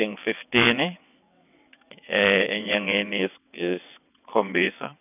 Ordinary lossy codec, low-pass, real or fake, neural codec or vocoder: none; 3.6 kHz; fake; vocoder, 22.05 kHz, 80 mel bands, WaveNeXt